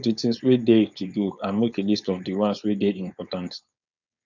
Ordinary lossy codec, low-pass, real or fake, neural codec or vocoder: none; 7.2 kHz; fake; codec, 16 kHz, 4.8 kbps, FACodec